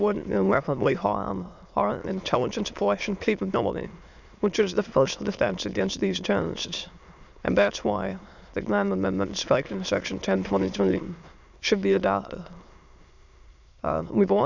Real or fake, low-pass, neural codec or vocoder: fake; 7.2 kHz; autoencoder, 22.05 kHz, a latent of 192 numbers a frame, VITS, trained on many speakers